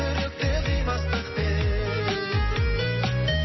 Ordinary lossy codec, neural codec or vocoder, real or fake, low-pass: MP3, 24 kbps; none; real; 7.2 kHz